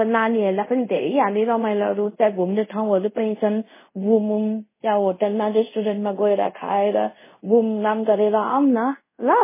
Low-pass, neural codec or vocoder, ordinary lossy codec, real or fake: 3.6 kHz; codec, 24 kHz, 0.5 kbps, DualCodec; MP3, 16 kbps; fake